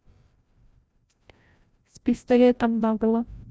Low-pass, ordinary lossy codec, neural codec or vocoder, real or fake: none; none; codec, 16 kHz, 0.5 kbps, FreqCodec, larger model; fake